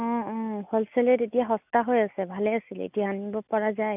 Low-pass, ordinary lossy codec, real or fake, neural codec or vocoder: 3.6 kHz; none; real; none